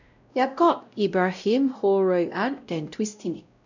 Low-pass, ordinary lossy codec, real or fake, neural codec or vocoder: 7.2 kHz; none; fake; codec, 16 kHz, 0.5 kbps, X-Codec, WavLM features, trained on Multilingual LibriSpeech